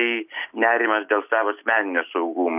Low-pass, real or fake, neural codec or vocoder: 3.6 kHz; real; none